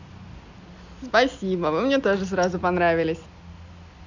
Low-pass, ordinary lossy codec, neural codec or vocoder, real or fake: 7.2 kHz; Opus, 64 kbps; none; real